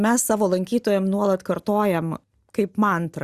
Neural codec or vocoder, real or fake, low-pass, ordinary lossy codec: none; real; 14.4 kHz; Opus, 64 kbps